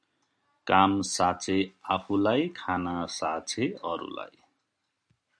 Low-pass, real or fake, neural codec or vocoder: 9.9 kHz; real; none